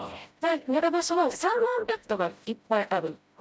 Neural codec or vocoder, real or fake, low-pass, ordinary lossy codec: codec, 16 kHz, 0.5 kbps, FreqCodec, smaller model; fake; none; none